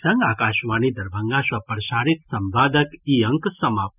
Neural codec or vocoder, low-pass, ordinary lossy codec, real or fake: none; 3.6 kHz; none; real